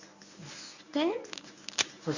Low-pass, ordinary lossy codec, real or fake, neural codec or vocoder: 7.2 kHz; none; fake; codec, 24 kHz, 0.9 kbps, WavTokenizer, medium speech release version 1